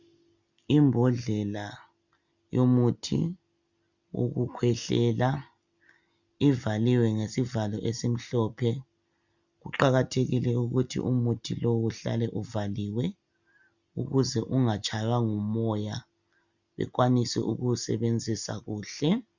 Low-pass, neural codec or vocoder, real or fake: 7.2 kHz; none; real